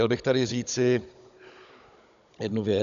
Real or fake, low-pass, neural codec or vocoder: fake; 7.2 kHz; codec, 16 kHz, 16 kbps, FunCodec, trained on Chinese and English, 50 frames a second